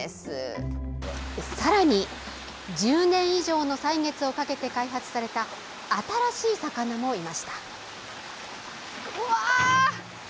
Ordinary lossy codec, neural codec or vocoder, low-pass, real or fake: none; none; none; real